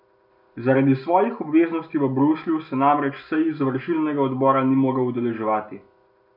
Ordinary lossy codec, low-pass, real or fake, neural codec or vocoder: none; 5.4 kHz; real; none